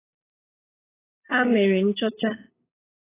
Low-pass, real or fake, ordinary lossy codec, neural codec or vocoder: 3.6 kHz; fake; AAC, 16 kbps; codec, 16 kHz, 8 kbps, FunCodec, trained on LibriTTS, 25 frames a second